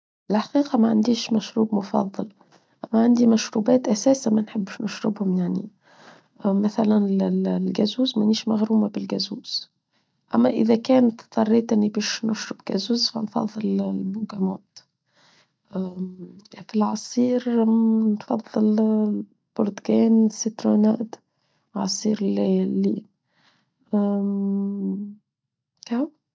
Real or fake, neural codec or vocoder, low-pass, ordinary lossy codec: real; none; none; none